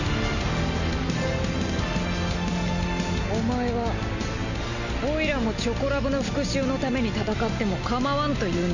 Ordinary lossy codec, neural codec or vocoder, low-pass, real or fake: none; none; 7.2 kHz; real